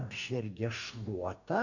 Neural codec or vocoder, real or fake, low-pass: codec, 44.1 kHz, 2.6 kbps, DAC; fake; 7.2 kHz